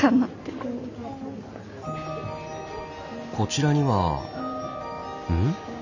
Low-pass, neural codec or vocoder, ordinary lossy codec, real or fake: 7.2 kHz; none; none; real